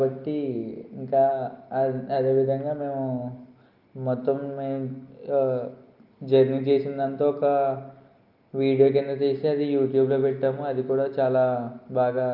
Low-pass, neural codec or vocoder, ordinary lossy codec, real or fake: 5.4 kHz; none; Opus, 24 kbps; real